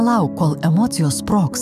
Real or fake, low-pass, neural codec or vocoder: fake; 14.4 kHz; autoencoder, 48 kHz, 128 numbers a frame, DAC-VAE, trained on Japanese speech